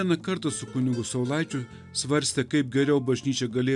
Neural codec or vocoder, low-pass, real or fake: none; 10.8 kHz; real